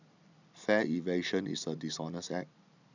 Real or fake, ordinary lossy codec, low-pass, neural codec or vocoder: real; none; 7.2 kHz; none